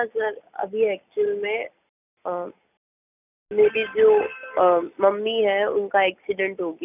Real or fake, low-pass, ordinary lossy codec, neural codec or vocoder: real; 3.6 kHz; none; none